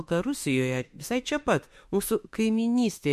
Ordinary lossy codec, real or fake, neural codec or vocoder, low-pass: MP3, 64 kbps; fake; autoencoder, 48 kHz, 32 numbers a frame, DAC-VAE, trained on Japanese speech; 14.4 kHz